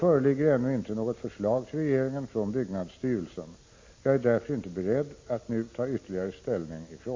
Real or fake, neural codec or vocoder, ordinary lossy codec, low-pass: real; none; MP3, 32 kbps; 7.2 kHz